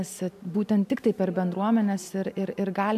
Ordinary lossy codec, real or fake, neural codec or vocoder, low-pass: AAC, 96 kbps; real; none; 14.4 kHz